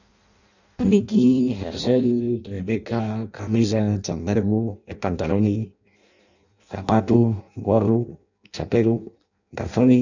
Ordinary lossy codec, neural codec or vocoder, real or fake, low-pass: none; codec, 16 kHz in and 24 kHz out, 0.6 kbps, FireRedTTS-2 codec; fake; 7.2 kHz